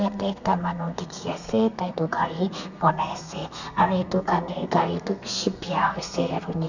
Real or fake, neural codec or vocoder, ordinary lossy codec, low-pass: fake; codec, 32 kHz, 1.9 kbps, SNAC; none; 7.2 kHz